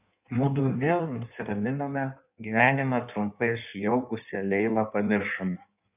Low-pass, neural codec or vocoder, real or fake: 3.6 kHz; codec, 16 kHz in and 24 kHz out, 1.1 kbps, FireRedTTS-2 codec; fake